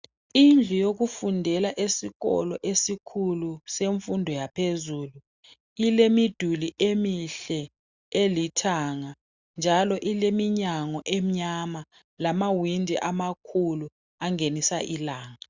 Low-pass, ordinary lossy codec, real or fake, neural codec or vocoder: 7.2 kHz; Opus, 64 kbps; real; none